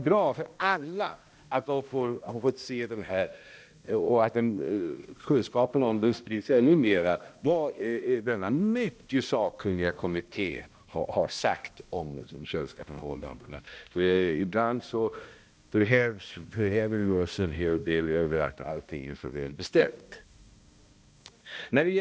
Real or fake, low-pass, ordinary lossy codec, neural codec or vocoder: fake; none; none; codec, 16 kHz, 1 kbps, X-Codec, HuBERT features, trained on balanced general audio